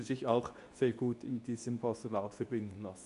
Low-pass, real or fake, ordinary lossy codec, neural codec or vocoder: 10.8 kHz; fake; none; codec, 24 kHz, 0.9 kbps, WavTokenizer, medium speech release version 2